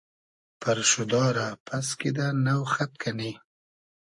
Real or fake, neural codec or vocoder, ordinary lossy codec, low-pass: real; none; AAC, 64 kbps; 10.8 kHz